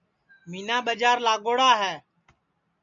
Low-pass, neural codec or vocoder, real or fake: 7.2 kHz; none; real